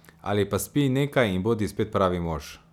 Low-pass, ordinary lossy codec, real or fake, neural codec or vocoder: 19.8 kHz; none; real; none